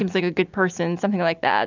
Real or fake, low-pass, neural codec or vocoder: real; 7.2 kHz; none